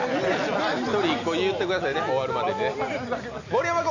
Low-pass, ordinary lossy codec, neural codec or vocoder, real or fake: 7.2 kHz; none; none; real